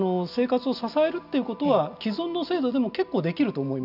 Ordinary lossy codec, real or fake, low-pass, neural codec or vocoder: none; real; 5.4 kHz; none